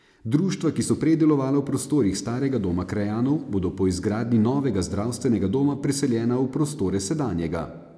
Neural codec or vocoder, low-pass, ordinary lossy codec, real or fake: none; none; none; real